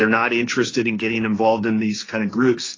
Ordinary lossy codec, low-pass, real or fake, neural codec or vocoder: AAC, 32 kbps; 7.2 kHz; fake; codec, 16 kHz, 1.1 kbps, Voila-Tokenizer